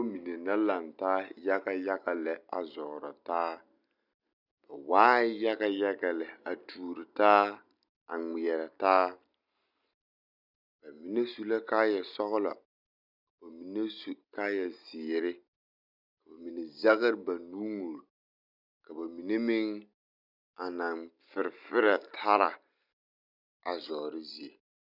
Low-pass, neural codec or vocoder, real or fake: 5.4 kHz; none; real